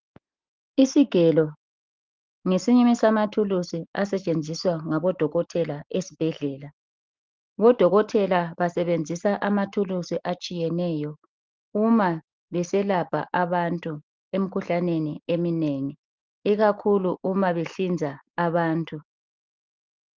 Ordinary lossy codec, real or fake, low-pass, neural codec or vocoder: Opus, 32 kbps; real; 7.2 kHz; none